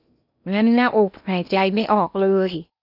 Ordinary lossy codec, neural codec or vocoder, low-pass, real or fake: none; codec, 16 kHz in and 24 kHz out, 0.8 kbps, FocalCodec, streaming, 65536 codes; 5.4 kHz; fake